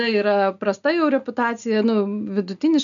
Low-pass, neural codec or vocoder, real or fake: 7.2 kHz; none; real